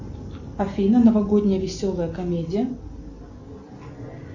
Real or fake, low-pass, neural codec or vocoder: real; 7.2 kHz; none